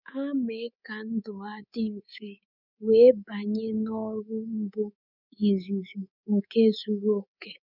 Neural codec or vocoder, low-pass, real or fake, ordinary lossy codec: codec, 24 kHz, 3.1 kbps, DualCodec; 5.4 kHz; fake; none